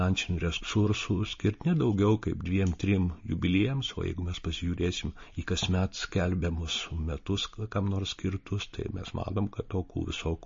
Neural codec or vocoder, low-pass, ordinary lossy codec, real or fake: none; 7.2 kHz; MP3, 32 kbps; real